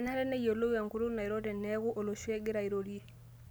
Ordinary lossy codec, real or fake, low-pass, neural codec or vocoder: none; real; none; none